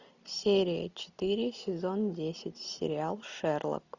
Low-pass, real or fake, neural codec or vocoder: 7.2 kHz; real; none